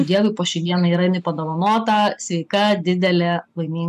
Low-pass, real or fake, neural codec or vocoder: 14.4 kHz; real; none